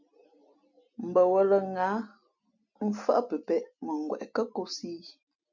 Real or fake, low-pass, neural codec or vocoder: real; 7.2 kHz; none